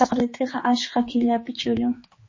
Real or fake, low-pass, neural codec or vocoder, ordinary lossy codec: fake; 7.2 kHz; codec, 16 kHz, 4 kbps, X-Codec, HuBERT features, trained on general audio; MP3, 32 kbps